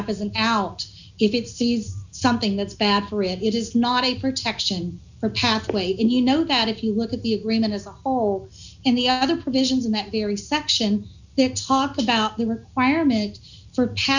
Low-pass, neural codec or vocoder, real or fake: 7.2 kHz; none; real